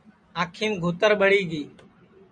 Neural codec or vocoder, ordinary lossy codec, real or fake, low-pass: none; MP3, 96 kbps; real; 9.9 kHz